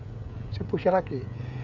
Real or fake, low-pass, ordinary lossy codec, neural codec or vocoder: fake; 7.2 kHz; none; codec, 16 kHz, 16 kbps, FreqCodec, smaller model